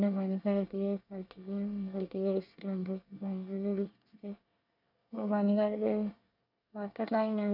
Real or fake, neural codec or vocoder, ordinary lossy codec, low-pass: fake; codec, 24 kHz, 1 kbps, SNAC; none; 5.4 kHz